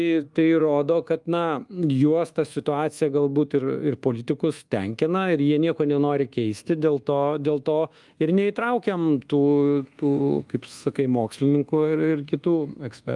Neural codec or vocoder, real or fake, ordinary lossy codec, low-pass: codec, 24 kHz, 1.2 kbps, DualCodec; fake; Opus, 32 kbps; 10.8 kHz